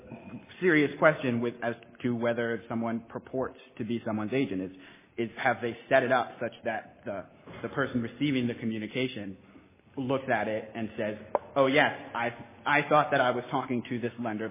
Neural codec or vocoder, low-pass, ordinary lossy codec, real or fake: none; 3.6 kHz; MP3, 16 kbps; real